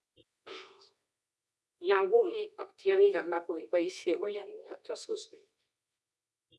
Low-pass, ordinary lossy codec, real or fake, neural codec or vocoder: none; none; fake; codec, 24 kHz, 0.9 kbps, WavTokenizer, medium music audio release